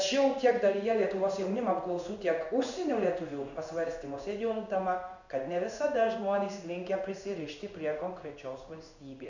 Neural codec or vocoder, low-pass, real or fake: codec, 16 kHz in and 24 kHz out, 1 kbps, XY-Tokenizer; 7.2 kHz; fake